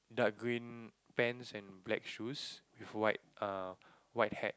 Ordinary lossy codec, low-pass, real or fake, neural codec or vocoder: none; none; real; none